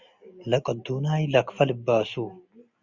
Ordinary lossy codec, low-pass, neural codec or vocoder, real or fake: Opus, 64 kbps; 7.2 kHz; none; real